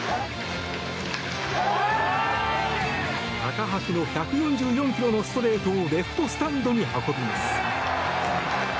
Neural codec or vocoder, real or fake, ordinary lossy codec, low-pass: none; real; none; none